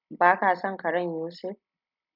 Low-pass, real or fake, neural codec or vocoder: 5.4 kHz; real; none